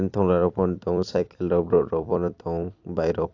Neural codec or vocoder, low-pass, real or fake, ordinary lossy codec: vocoder, 44.1 kHz, 128 mel bands every 256 samples, BigVGAN v2; 7.2 kHz; fake; AAC, 48 kbps